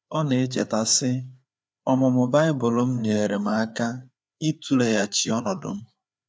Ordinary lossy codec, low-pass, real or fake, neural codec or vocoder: none; none; fake; codec, 16 kHz, 4 kbps, FreqCodec, larger model